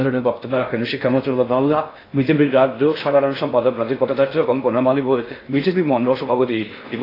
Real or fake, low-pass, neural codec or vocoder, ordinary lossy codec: fake; 5.4 kHz; codec, 16 kHz in and 24 kHz out, 0.6 kbps, FocalCodec, streaming, 2048 codes; AAC, 24 kbps